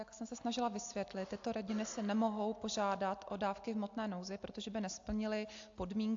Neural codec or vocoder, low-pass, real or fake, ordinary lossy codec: none; 7.2 kHz; real; AAC, 48 kbps